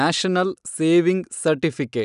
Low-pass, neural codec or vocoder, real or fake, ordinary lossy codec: 10.8 kHz; none; real; none